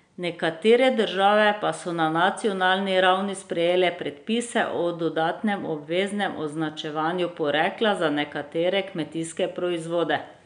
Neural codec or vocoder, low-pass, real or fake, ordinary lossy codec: none; 9.9 kHz; real; none